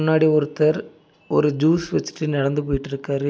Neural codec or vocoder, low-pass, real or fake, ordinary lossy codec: none; none; real; none